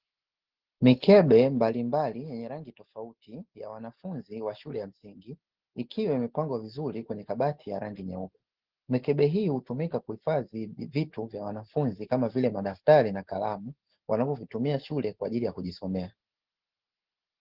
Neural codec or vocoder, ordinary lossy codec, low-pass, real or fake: none; Opus, 16 kbps; 5.4 kHz; real